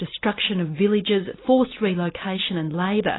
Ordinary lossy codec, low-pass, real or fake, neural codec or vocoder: AAC, 16 kbps; 7.2 kHz; real; none